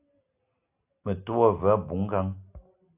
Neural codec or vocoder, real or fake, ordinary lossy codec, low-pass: none; real; AAC, 32 kbps; 3.6 kHz